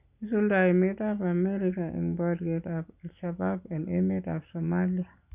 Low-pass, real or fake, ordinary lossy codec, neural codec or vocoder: 3.6 kHz; real; none; none